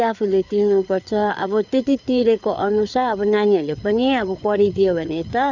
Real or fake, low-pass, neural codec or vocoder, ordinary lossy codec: fake; 7.2 kHz; codec, 16 kHz, 4 kbps, FreqCodec, larger model; none